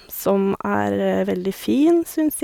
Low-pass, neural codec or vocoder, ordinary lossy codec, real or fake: 19.8 kHz; none; none; real